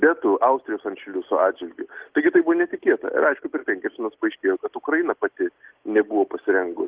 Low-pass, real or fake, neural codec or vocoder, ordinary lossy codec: 3.6 kHz; real; none; Opus, 16 kbps